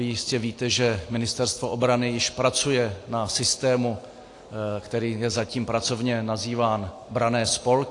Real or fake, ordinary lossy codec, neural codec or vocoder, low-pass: real; AAC, 48 kbps; none; 10.8 kHz